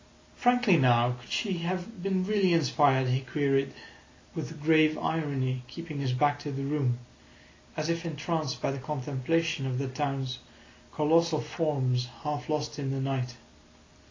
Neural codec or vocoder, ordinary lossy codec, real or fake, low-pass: none; AAC, 32 kbps; real; 7.2 kHz